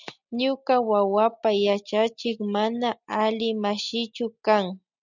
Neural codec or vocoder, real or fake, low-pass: none; real; 7.2 kHz